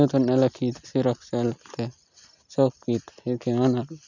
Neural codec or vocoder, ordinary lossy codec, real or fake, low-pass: none; none; real; 7.2 kHz